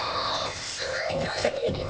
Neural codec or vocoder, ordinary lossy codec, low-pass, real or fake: codec, 16 kHz, 0.8 kbps, ZipCodec; none; none; fake